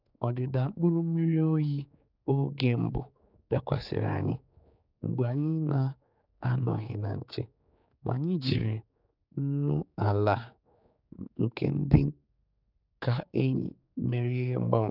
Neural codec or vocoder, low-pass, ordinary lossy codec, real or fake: codec, 16 kHz, 4 kbps, X-Codec, HuBERT features, trained on general audio; 5.4 kHz; none; fake